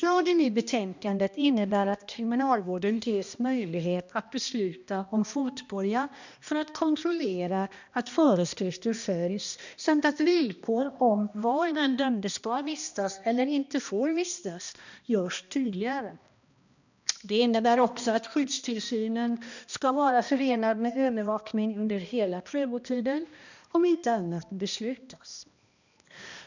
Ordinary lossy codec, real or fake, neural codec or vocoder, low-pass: none; fake; codec, 16 kHz, 1 kbps, X-Codec, HuBERT features, trained on balanced general audio; 7.2 kHz